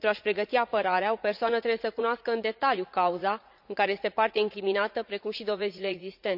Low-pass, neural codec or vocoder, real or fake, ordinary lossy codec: 5.4 kHz; vocoder, 44.1 kHz, 80 mel bands, Vocos; fake; none